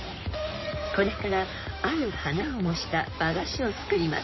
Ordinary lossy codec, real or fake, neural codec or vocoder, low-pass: MP3, 24 kbps; fake; codec, 16 kHz in and 24 kHz out, 2.2 kbps, FireRedTTS-2 codec; 7.2 kHz